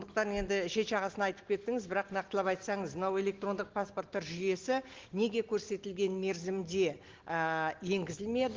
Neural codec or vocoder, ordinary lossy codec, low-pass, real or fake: none; Opus, 16 kbps; 7.2 kHz; real